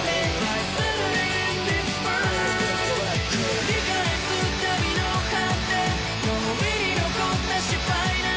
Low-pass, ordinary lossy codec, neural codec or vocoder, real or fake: none; none; none; real